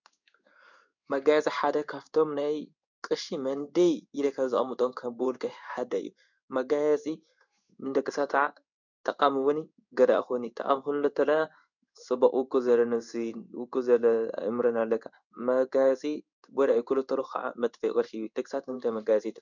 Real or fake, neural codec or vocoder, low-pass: fake; codec, 16 kHz in and 24 kHz out, 1 kbps, XY-Tokenizer; 7.2 kHz